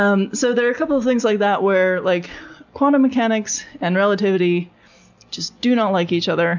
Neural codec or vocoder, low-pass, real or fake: none; 7.2 kHz; real